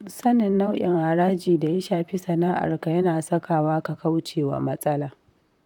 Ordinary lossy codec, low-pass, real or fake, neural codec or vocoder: none; 19.8 kHz; fake; vocoder, 44.1 kHz, 128 mel bands, Pupu-Vocoder